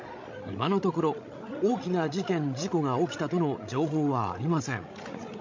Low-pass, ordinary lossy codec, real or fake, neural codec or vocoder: 7.2 kHz; MP3, 48 kbps; fake; codec, 16 kHz, 16 kbps, FreqCodec, larger model